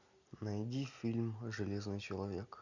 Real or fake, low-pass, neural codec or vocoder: real; 7.2 kHz; none